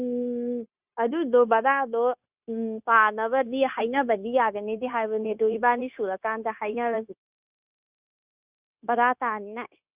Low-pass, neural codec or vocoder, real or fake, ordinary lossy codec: 3.6 kHz; codec, 16 kHz, 0.9 kbps, LongCat-Audio-Codec; fake; Opus, 64 kbps